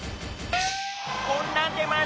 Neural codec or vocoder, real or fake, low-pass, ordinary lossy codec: none; real; none; none